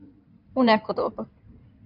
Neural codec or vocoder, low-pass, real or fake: codec, 16 kHz in and 24 kHz out, 2.2 kbps, FireRedTTS-2 codec; 5.4 kHz; fake